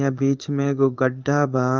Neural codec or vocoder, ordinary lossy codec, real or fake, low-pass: codec, 16 kHz in and 24 kHz out, 1 kbps, XY-Tokenizer; Opus, 24 kbps; fake; 7.2 kHz